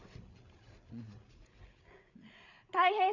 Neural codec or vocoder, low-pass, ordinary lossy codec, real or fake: codec, 16 kHz, 16 kbps, FreqCodec, larger model; 7.2 kHz; none; fake